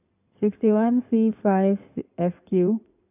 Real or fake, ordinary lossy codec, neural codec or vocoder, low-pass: fake; none; codec, 16 kHz in and 24 kHz out, 2.2 kbps, FireRedTTS-2 codec; 3.6 kHz